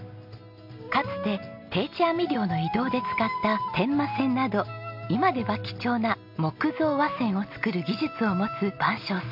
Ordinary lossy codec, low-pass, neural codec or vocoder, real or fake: MP3, 48 kbps; 5.4 kHz; none; real